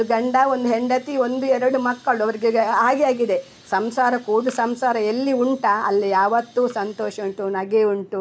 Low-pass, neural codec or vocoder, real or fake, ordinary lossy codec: none; none; real; none